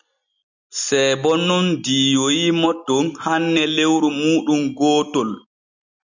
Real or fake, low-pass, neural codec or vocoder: real; 7.2 kHz; none